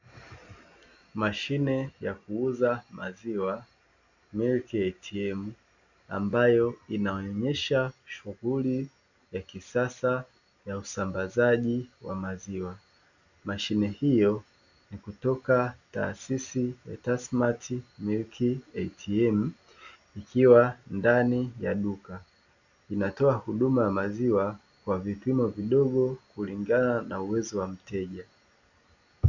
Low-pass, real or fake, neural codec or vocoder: 7.2 kHz; real; none